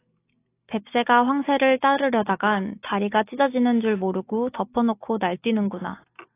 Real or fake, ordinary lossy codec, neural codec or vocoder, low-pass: real; AAC, 24 kbps; none; 3.6 kHz